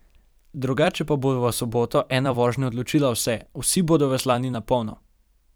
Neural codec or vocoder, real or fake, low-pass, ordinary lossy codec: vocoder, 44.1 kHz, 128 mel bands every 256 samples, BigVGAN v2; fake; none; none